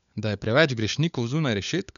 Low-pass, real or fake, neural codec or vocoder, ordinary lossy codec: 7.2 kHz; fake; codec, 16 kHz, 4 kbps, FunCodec, trained on LibriTTS, 50 frames a second; none